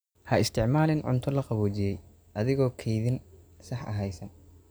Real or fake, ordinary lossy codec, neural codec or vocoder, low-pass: real; none; none; none